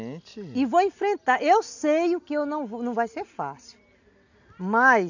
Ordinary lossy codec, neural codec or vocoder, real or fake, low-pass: none; none; real; 7.2 kHz